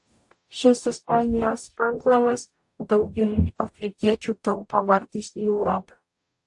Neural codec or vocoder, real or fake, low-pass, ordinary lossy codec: codec, 44.1 kHz, 0.9 kbps, DAC; fake; 10.8 kHz; AAC, 64 kbps